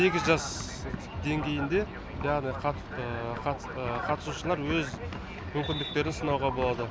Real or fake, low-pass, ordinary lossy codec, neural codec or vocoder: real; none; none; none